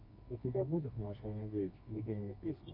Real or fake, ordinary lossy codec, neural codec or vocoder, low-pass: fake; MP3, 32 kbps; codec, 24 kHz, 0.9 kbps, WavTokenizer, medium music audio release; 5.4 kHz